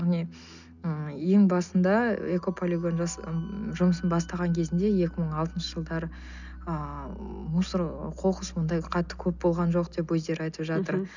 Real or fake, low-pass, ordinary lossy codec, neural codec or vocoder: real; 7.2 kHz; none; none